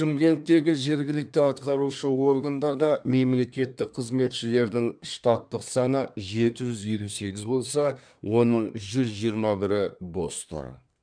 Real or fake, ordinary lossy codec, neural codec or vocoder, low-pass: fake; none; codec, 24 kHz, 1 kbps, SNAC; 9.9 kHz